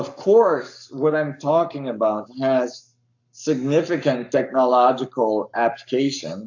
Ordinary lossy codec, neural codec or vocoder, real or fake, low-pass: AAC, 48 kbps; codec, 44.1 kHz, 7.8 kbps, Pupu-Codec; fake; 7.2 kHz